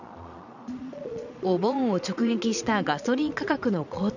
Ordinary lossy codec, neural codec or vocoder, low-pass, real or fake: none; vocoder, 22.05 kHz, 80 mel bands, Vocos; 7.2 kHz; fake